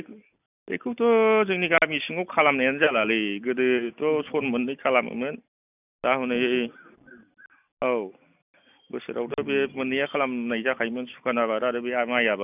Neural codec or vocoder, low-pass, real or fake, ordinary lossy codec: none; 3.6 kHz; real; none